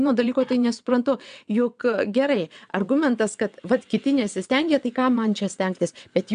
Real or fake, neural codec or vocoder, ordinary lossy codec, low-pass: fake; vocoder, 22.05 kHz, 80 mel bands, WaveNeXt; AAC, 96 kbps; 9.9 kHz